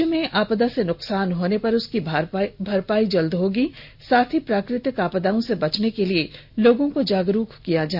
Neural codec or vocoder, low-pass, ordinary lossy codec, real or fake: none; 5.4 kHz; none; real